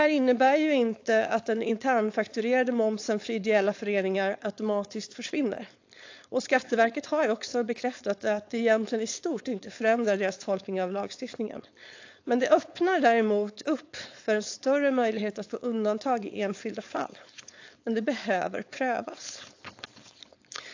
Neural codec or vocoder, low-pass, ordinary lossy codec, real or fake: codec, 16 kHz, 4.8 kbps, FACodec; 7.2 kHz; AAC, 48 kbps; fake